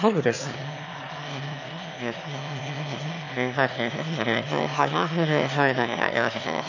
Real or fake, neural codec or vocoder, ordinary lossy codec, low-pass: fake; autoencoder, 22.05 kHz, a latent of 192 numbers a frame, VITS, trained on one speaker; none; 7.2 kHz